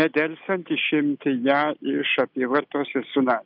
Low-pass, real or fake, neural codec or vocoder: 5.4 kHz; real; none